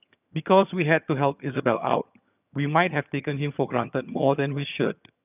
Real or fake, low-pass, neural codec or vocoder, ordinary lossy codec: fake; 3.6 kHz; vocoder, 22.05 kHz, 80 mel bands, HiFi-GAN; none